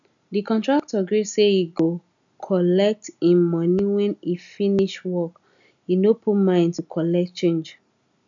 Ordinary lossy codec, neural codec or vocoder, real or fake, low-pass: none; none; real; 7.2 kHz